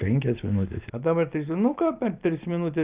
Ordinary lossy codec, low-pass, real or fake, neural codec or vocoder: Opus, 24 kbps; 3.6 kHz; real; none